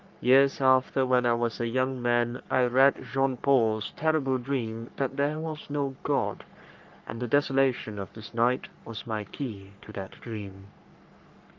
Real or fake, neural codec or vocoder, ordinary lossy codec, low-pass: fake; codec, 44.1 kHz, 3.4 kbps, Pupu-Codec; Opus, 24 kbps; 7.2 kHz